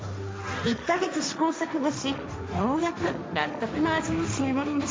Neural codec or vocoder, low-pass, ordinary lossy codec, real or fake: codec, 16 kHz, 1.1 kbps, Voila-Tokenizer; none; none; fake